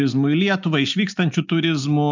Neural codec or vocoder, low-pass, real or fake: none; 7.2 kHz; real